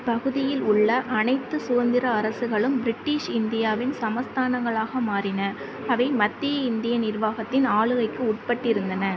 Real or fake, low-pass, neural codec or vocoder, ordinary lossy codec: real; none; none; none